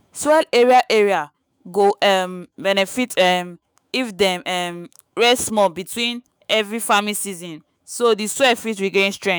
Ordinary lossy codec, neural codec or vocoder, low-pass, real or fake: none; autoencoder, 48 kHz, 128 numbers a frame, DAC-VAE, trained on Japanese speech; none; fake